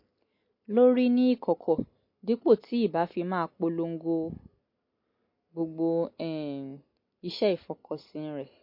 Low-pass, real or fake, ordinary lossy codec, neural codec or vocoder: 5.4 kHz; real; MP3, 32 kbps; none